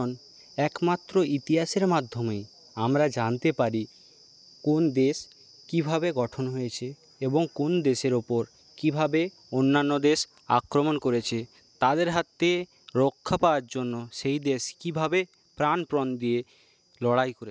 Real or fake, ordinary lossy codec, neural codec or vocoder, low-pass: real; none; none; none